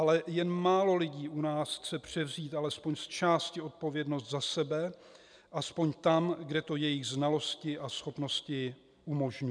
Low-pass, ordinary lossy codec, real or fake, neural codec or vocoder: 9.9 kHz; MP3, 96 kbps; real; none